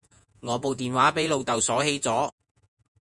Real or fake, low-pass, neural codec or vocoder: fake; 10.8 kHz; vocoder, 48 kHz, 128 mel bands, Vocos